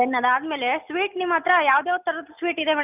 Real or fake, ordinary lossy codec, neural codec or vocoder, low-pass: real; none; none; 3.6 kHz